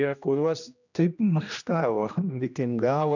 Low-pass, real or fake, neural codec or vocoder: 7.2 kHz; fake; codec, 16 kHz, 1 kbps, X-Codec, HuBERT features, trained on general audio